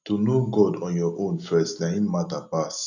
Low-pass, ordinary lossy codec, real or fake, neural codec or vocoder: 7.2 kHz; none; real; none